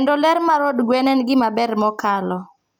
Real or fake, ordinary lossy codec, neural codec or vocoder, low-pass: real; none; none; none